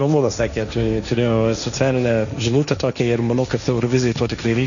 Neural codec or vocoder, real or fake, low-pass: codec, 16 kHz, 1.1 kbps, Voila-Tokenizer; fake; 7.2 kHz